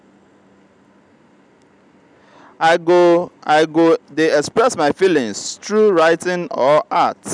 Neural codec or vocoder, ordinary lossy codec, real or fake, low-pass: none; none; real; 9.9 kHz